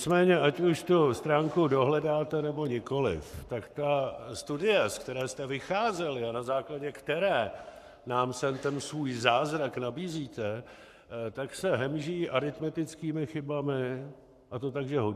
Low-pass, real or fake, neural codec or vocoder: 14.4 kHz; fake; codec, 44.1 kHz, 7.8 kbps, Pupu-Codec